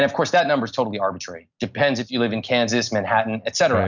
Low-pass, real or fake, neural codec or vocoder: 7.2 kHz; real; none